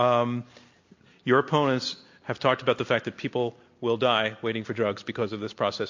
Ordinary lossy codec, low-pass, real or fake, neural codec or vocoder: MP3, 48 kbps; 7.2 kHz; real; none